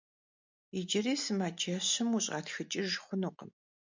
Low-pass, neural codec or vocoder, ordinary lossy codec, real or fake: 7.2 kHz; none; MP3, 64 kbps; real